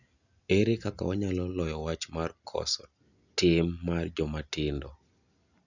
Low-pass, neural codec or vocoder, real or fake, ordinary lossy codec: 7.2 kHz; none; real; none